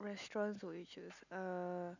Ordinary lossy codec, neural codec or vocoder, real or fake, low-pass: none; none; real; 7.2 kHz